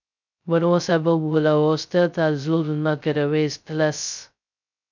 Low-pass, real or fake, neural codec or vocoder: 7.2 kHz; fake; codec, 16 kHz, 0.2 kbps, FocalCodec